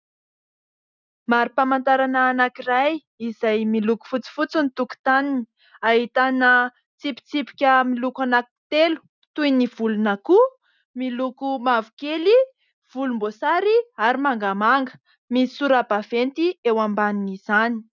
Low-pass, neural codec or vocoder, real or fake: 7.2 kHz; none; real